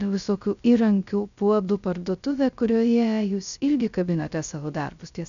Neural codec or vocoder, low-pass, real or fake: codec, 16 kHz, 0.3 kbps, FocalCodec; 7.2 kHz; fake